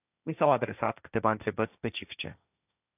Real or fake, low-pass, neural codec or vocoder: fake; 3.6 kHz; codec, 16 kHz, 1.1 kbps, Voila-Tokenizer